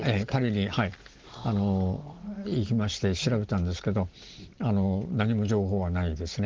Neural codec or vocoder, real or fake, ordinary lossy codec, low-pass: none; real; Opus, 16 kbps; 7.2 kHz